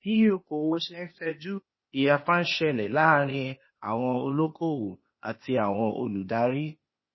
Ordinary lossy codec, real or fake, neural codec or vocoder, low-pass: MP3, 24 kbps; fake; codec, 16 kHz, 0.8 kbps, ZipCodec; 7.2 kHz